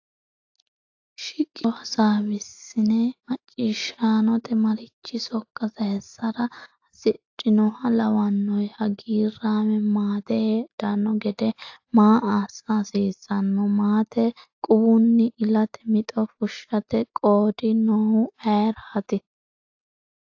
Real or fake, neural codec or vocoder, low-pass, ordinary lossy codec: real; none; 7.2 kHz; AAC, 48 kbps